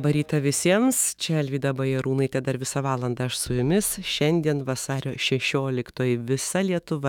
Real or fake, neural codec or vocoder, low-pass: fake; autoencoder, 48 kHz, 128 numbers a frame, DAC-VAE, trained on Japanese speech; 19.8 kHz